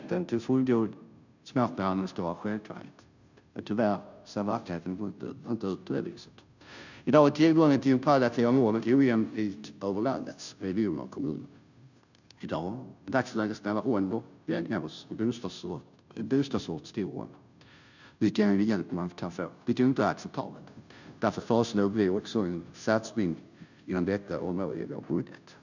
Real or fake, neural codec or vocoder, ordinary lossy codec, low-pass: fake; codec, 16 kHz, 0.5 kbps, FunCodec, trained on Chinese and English, 25 frames a second; none; 7.2 kHz